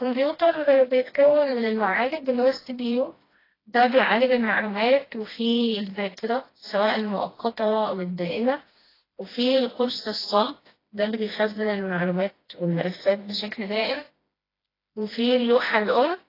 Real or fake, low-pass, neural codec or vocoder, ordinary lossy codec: fake; 5.4 kHz; codec, 16 kHz, 1 kbps, FreqCodec, smaller model; AAC, 24 kbps